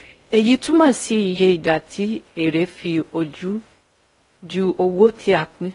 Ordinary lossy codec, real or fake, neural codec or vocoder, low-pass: AAC, 32 kbps; fake; codec, 16 kHz in and 24 kHz out, 0.6 kbps, FocalCodec, streaming, 4096 codes; 10.8 kHz